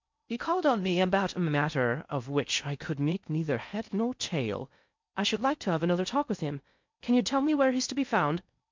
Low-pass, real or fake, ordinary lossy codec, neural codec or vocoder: 7.2 kHz; fake; MP3, 64 kbps; codec, 16 kHz in and 24 kHz out, 0.6 kbps, FocalCodec, streaming, 2048 codes